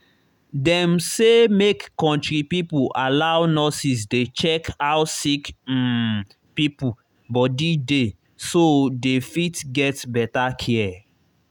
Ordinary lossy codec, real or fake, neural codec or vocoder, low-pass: none; real; none; 19.8 kHz